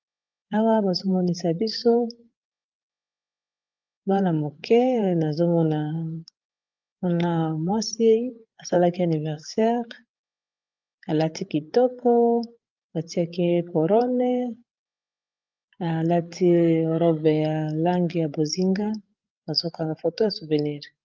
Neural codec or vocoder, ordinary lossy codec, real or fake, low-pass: codec, 16 kHz, 8 kbps, FreqCodec, larger model; Opus, 24 kbps; fake; 7.2 kHz